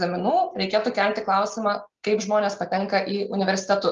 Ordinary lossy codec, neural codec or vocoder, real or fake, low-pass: Opus, 32 kbps; none; real; 7.2 kHz